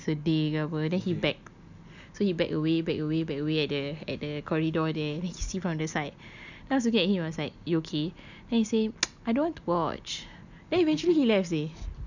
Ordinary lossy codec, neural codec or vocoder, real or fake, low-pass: none; none; real; 7.2 kHz